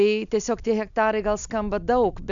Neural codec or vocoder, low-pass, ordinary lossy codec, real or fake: none; 7.2 kHz; MP3, 64 kbps; real